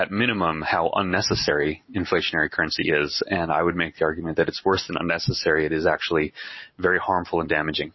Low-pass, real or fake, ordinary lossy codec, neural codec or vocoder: 7.2 kHz; real; MP3, 24 kbps; none